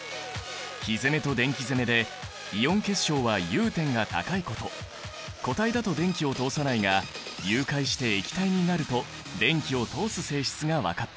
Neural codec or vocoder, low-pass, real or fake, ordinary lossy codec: none; none; real; none